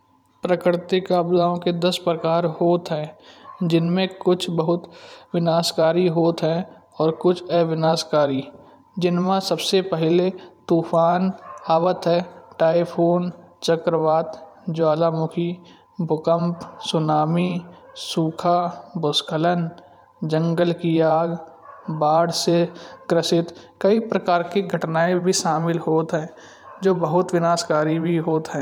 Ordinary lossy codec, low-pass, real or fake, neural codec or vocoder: none; 19.8 kHz; fake; vocoder, 44.1 kHz, 128 mel bands every 512 samples, BigVGAN v2